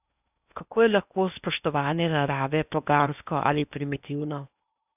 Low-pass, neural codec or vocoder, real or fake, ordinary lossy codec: 3.6 kHz; codec, 16 kHz in and 24 kHz out, 0.8 kbps, FocalCodec, streaming, 65536 codes; fake; none